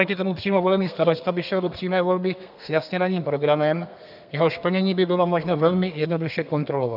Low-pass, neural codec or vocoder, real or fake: 5.4 kHz; codec, 32 kHz, 1.9 kbps, SNAC; fake